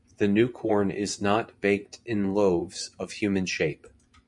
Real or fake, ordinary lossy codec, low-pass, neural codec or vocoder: fake; MP3, 96 kbps; 10.8 kHz; vocoder, 24 kHz, 100 mel bands, Vocos